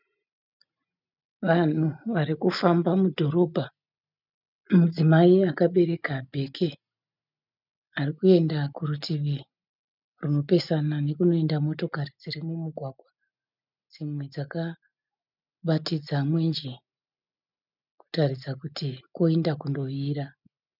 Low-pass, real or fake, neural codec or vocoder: 5.4 kHz; real; none